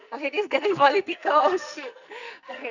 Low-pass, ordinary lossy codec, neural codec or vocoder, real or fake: 7.2 kHz; none; codec, 44.1 kHz, 2.6 kbps, SNAC; fake